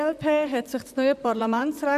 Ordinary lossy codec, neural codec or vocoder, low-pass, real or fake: AAC, 96 kbps; vocoder, 44.1 kHz, 128 mel bands, Pupu-Vocoder; 14.4 kHz; fake